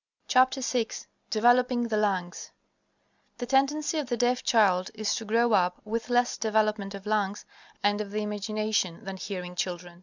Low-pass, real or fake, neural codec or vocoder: 7.2 kHz; real; none